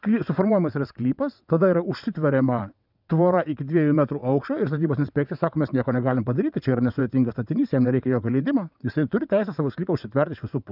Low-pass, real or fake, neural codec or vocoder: 5.4 kHz; fake; vocoder, 22.05 kHz, 80 mel bands, WaveNeXt